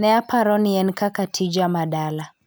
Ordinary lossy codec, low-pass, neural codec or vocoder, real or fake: none; none; none; real